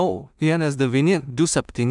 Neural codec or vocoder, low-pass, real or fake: codec, 16 kHz in and 24 kHz out, 0.4 kbps, LongCat-Audio-Codec, two codebook decoder; 10.8 kHz; fake